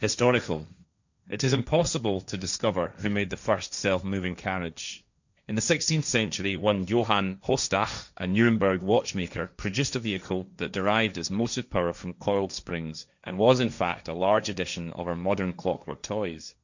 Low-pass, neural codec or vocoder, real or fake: 7.2 kHz; codec, 16 kHz, 1.1 kbps, Voila-Tokenizer; fake